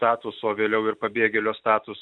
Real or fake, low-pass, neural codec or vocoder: real; 9.9 kHz; none